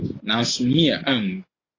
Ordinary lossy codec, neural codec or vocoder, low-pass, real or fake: AAC, 32 kbps; none; 7.2 kHz; real